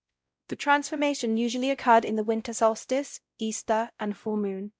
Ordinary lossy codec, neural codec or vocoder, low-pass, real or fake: none; codec, 16 kHz, 0.5 kbps, X-Codec, WavLM features, trained on Multilingual LibriSpeech; none; fake